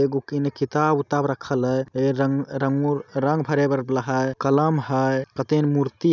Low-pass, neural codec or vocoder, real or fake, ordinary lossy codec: 7.2 kHz; none; real; none